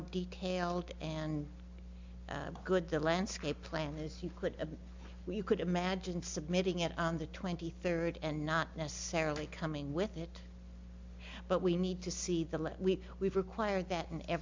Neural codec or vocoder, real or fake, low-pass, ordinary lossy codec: none; real; 7.2 kHz; MP3, 64 kbps